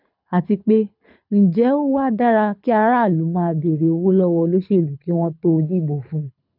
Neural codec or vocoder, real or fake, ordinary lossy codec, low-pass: codec, 24 kHz, 6 kbps, HILCodec; fake; none; 5.4 kHz